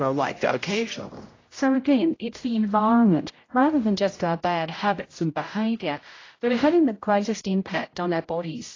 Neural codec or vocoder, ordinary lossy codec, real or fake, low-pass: codec, 16 kHz, 0.5 kbps, X-Codec, HuBERT features, trained on general audio; AAC, 32 kbps; fake; 7.2 kHz